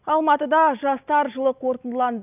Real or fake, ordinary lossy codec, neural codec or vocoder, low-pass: real; none; none; 3.6 kHz